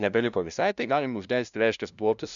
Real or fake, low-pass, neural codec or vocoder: fake; 7.2 kHz; codec, 16 kHz, 0.5 kbps, FunCodec, trained on LibriTTS, 25 frames a second